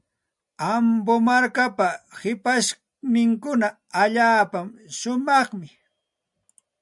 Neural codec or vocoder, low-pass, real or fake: none; 10.8 kHz; real